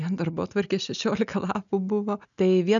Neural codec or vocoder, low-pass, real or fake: none; 7.2 kHz; real